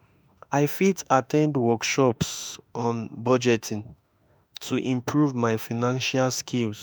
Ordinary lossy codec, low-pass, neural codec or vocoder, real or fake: none; none; autoencoder, 48 kHz, 32 numbers a frame, DAC-VAE, trained on Japanese speech; fake